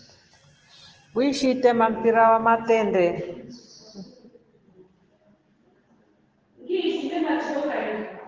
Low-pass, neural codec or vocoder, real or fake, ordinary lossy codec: 7.2 kHz; none; real; Opus, 16 kbps